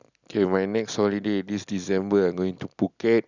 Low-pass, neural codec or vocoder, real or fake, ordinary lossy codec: 7.2 kHz; none; real; none